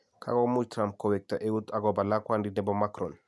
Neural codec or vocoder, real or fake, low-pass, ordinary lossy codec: none; real; none; none